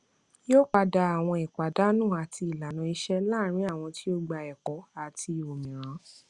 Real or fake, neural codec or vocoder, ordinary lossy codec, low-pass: real; none; Opus, 64 kbps; 10.8 kHz